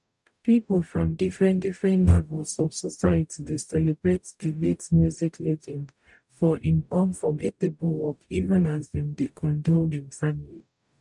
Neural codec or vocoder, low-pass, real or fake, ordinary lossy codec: codec, 44.1 kHz, 0.9 kbps, DAC; 10.8 kHz; fake; none